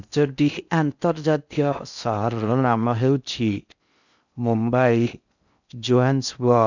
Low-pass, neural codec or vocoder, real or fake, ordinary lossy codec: 7.2 kHz; codec, 16 kHz in and 24 kHz out, 0.6 kbps, FocalCodec, streaming, 4096 codes; fake; none